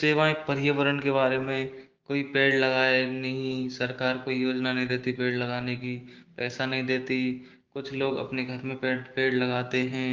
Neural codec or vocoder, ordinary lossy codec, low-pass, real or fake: codec, 16 kHz, 6 kbps, DAC; Opus, 32 kbps; 7.2 kHz; fake